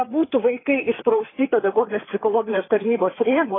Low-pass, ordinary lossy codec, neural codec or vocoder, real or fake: 7.2 kHz; AAC, 16 kbps; codec, 16 kHz, 4 kbps, FreqCodec, larger model; fake